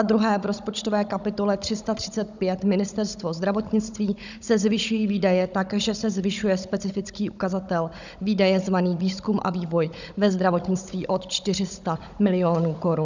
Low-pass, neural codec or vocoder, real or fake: 7.2 kHz; codec, 16 kHz, 16 kbps, FunCodec, trained on Chinese and English, 50 frames a second; fake